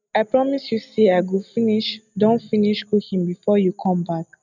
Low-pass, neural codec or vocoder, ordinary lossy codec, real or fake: 7.2 kHz; none; none; real